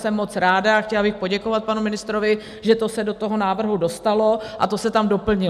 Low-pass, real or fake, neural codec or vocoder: 14.4 kHz; real; none